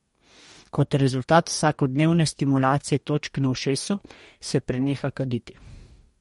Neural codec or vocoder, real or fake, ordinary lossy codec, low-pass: codec, 44.1 kHz, 2.6 kbps, DAC; fake; MP3, 48 kbps; 19.8 kHz